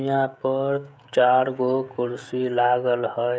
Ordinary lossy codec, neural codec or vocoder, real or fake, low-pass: none; codec, 16 kHz, 16 kbps, FreqCodec, smaller model; fake; none